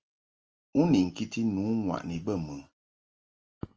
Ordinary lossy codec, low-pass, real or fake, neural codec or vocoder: Opus, 24 kbps; 7.2 kHz; real; none